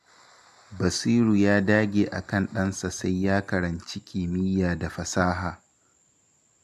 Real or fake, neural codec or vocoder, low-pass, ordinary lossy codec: real; none; 14.4 kHz; AAC, 96 kbps